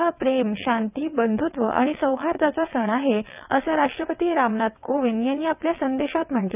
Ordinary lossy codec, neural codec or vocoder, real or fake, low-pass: none; vocoder, 22.05 kHz, 80 mel bands, WaveNeXt; fake; 3.6 kHz